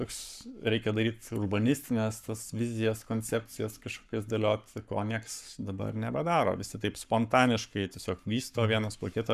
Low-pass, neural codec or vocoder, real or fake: 14.4 kHz; codec, 44.1 kHz, 7.8 kbps, Pupu-Codec; fake